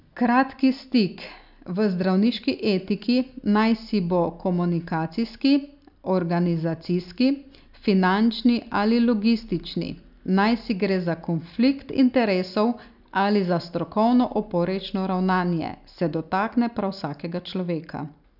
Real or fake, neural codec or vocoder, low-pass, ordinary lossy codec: real; none; 5.4 kHz; none